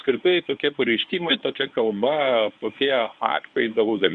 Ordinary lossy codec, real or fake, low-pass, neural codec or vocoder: MP3, 64 kbps; fake; 10.8 kHz; codec, 24 kHz, 0.9 kbps, WavTokenizer, medium speech release version 1